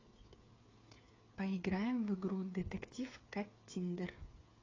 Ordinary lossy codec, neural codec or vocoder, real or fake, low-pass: AAC, 32 kbps; codec, 16 kHz, 8 kbps, FreqCodec, smaller model; fake; 7.2 kHz